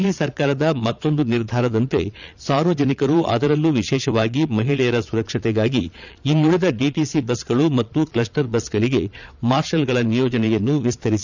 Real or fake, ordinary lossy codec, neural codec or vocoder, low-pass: fake; none; vocoder, 22.05 kHz, 80 mel bands, Vocos; 7.2 kHz